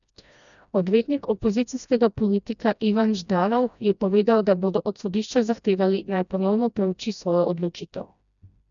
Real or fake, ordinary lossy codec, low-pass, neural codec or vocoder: fake; none; 7.2 kHz; codec, 16 kHz, 1 kbps, FreqCodec, smaller model